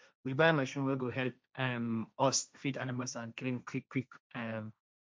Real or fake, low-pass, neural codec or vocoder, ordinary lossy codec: fake; none; codec, 16 kHz, 1.1 kbps, Voila-Tokenizer; none